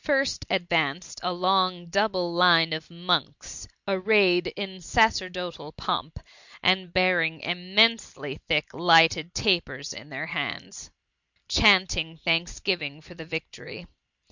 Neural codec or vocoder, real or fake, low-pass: none; real; 7.2 kHz